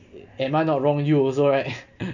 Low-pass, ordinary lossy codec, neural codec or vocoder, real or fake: 7.2 kHz; none; none; real